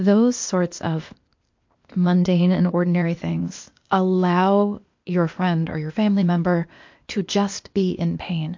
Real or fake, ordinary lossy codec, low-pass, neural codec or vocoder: fake; MP3, 48 kbps; 7.2 kHz; codec, 16 kHz, 0.8 kbps, ZipCodec